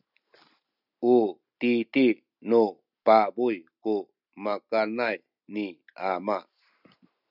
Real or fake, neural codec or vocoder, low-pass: real; none; 5.4 kHz